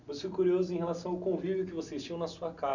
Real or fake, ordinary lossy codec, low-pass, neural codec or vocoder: real; none; 7.2 kHz; none